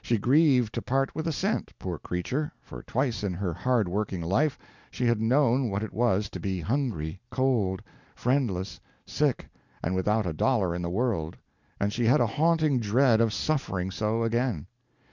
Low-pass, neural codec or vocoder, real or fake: 7.2 kHz; none; real